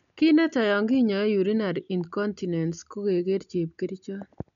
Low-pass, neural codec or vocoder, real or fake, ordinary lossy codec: 7.2 kHz; none; real; none